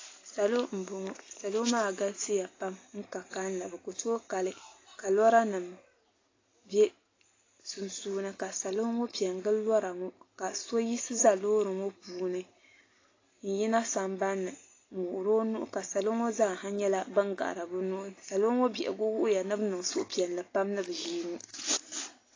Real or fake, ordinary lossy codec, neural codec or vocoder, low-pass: real; AAC, 32 kbps; none; 7.2 kHz